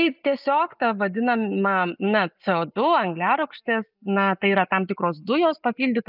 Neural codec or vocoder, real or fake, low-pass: none; real; 5.4 kHz